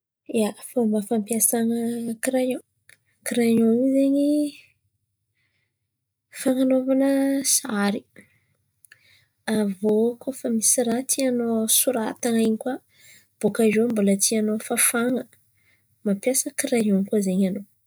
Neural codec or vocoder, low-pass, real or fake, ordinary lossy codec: none; none; real; none